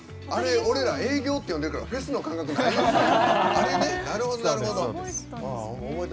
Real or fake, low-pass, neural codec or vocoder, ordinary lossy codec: real; none; none; none